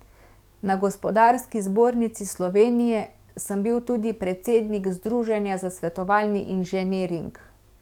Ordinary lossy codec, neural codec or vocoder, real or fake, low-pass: none; codec, 44.1 kHz, 7.8 kbps, DAC; fake; 19.8 kHz